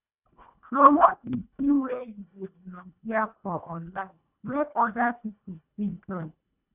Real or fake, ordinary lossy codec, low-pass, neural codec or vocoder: fake; none; 3.6 kHz; codec, 24 kHz, 1.5 kbps, HILCodec